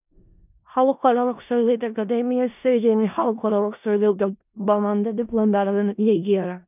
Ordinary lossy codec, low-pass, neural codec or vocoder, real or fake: none; 3.6 kHz; codec, 16 kHz in and 24 kHz out, 0.4 kbps, LongCat-Audio-Codec, four codebook decoder; fake